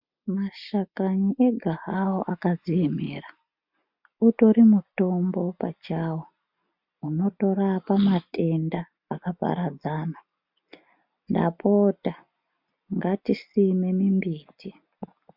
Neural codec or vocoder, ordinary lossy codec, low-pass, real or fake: none; MP3, 48 kbps; 5.4 kHz; real